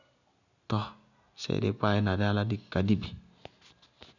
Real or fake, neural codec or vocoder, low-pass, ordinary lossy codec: real; none; 7.2 kHz; none